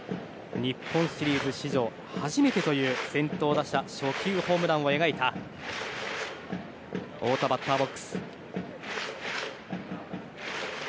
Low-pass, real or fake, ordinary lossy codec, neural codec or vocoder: none; real; none; none